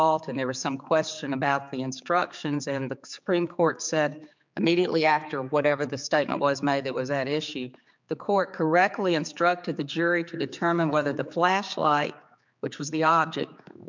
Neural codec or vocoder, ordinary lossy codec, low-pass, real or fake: codec, 16 kHz, 4 kbps, X-Codec, HuBERT features, trained on general audio; MP3, 64 kbps; 7.2 kHz; fake